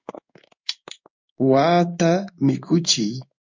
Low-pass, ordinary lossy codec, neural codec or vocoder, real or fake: 7.2 kHz; MP3, 64 kbps; codec, 16 kHz in and 24 kHz out, 1 kbps, XY-Tokenizer; fake